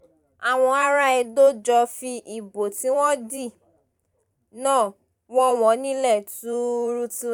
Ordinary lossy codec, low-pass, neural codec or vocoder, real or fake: none; 19.8 kHz; vocoder, 44.1 kHz, 128 mel bands every 512 samples, BigVGAN v2; fake